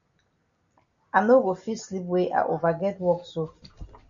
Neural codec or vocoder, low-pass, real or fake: none; 7.2 kHz; real